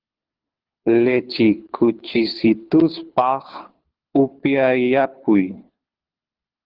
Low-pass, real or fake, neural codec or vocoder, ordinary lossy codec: 5.4 kHz; fake; codec, 24 kHz, 6 kbps, HILCodec; Opus, 16 kbps